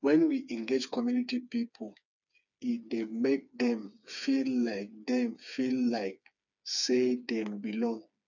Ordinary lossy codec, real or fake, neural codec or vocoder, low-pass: none; fake; codec, 16 kHz, 4 kbps, FreqCodec, smaller model; 7.2 kHz